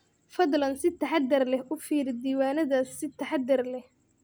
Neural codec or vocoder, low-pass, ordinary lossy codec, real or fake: none; none; none; real